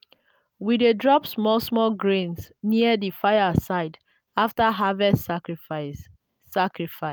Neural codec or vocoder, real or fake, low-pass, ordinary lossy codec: none; real; none; none